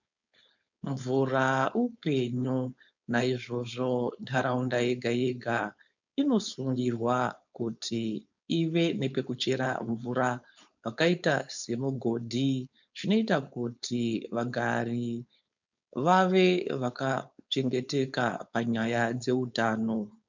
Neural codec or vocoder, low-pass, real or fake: codec, 16 kHz, 4.8 kbps, FACodec; 7.2 kHz; fake